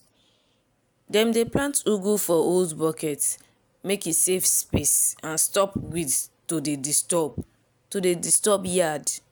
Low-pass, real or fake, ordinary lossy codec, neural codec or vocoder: none; real; none; none